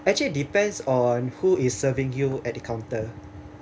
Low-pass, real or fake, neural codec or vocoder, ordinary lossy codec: none; real; none; none